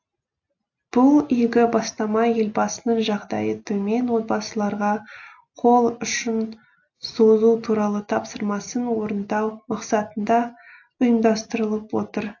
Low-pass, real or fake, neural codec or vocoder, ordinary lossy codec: 7.2 kHz; real; none; AAC, 48 kbps